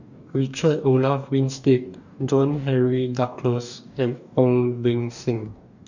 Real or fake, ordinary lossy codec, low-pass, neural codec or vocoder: fake; none; 7.2 kHz; codec, 44.1 kHz, 2.6 kbps, DAC